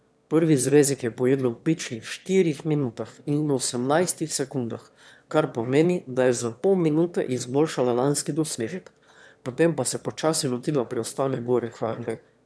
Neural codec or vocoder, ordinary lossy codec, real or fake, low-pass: autoencoder, 22.05 kHz, a latent of 192 numbers a frame, VITS, trained on one speaker; none; fake; none